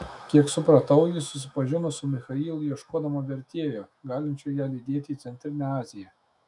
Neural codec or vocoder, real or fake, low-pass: autoencoder, 48 kHz, 128 numbers a frame, DAC-VAE, trained on Japanese speech; fake; 10.8 kHz